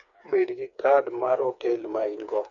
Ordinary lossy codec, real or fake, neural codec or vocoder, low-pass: none; fake; codec, 16 kHz, 4 kbps, FreqCodec, smaller model; 7.2 kHz